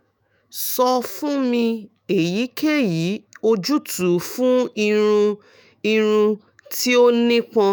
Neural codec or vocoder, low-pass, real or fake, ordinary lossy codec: autoencoder, 48 kHz, 128 numbers a frame, DAC-VAE, trained on Japanese speech; none; fake; none